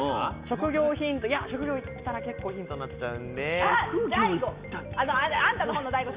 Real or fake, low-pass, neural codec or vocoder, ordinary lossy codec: real; 3.6 kHz; none; Opus, 64 kbps